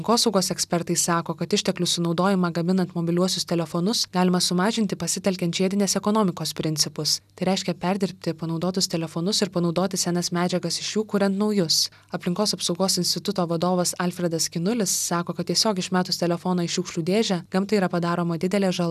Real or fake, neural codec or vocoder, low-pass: real; none; 14.4 kHz